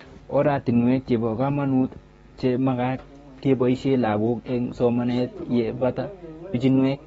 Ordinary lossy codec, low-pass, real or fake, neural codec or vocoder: AAC, 24 kbps; 19.8 kHz; fake; codec, 44.1 kHz, 7.8 kbps, DAC